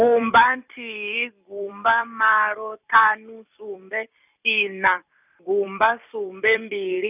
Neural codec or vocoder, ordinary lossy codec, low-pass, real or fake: vocoder, 44.1 kHz, 128 mel bands every 256 samples, BigVGAN v2; none; 3.6 kHz; fake